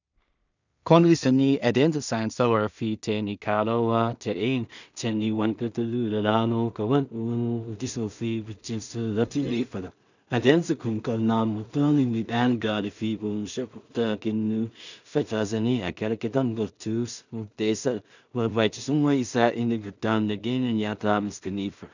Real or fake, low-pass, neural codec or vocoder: fake; 7.2 kHz; codec, 16 kHz in and 24 kHz out, 0.4 kbps, LongCat-Audio-Codec, two codebook decoder